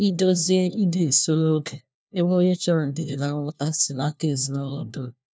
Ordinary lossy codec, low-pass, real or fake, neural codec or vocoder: none; none; fake; codec, 16 kHz, 1 kbps, FunCodec, trained on LibriTTS, 50 frames a second